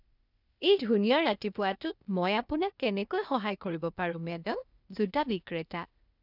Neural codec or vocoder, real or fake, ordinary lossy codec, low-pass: codec, 16 kHz, 0.8 kbps, ZipCodec; fake; none; 5.4 kHz